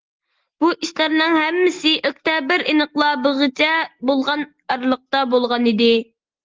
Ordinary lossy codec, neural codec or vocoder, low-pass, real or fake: Opus, 16 kbps; none; 7.2 kHz; real